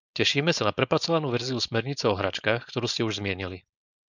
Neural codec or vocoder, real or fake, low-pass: codec, 16 kHz, 4.8 kbps, FACodec; fake; 7.2 kHz